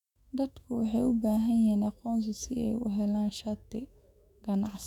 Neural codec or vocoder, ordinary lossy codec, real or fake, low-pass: autoencoder, 48 kHz, 128 numbers a frame, DAC-VAE, trained on Japanese speech; none; fake; 19.8 kHz